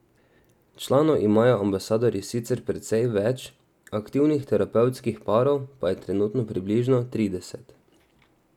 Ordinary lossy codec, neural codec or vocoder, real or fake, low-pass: none; none; real; 19.8 kHz